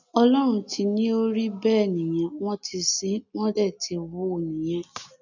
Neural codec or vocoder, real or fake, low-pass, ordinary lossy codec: none; real; 7.2 kHz; none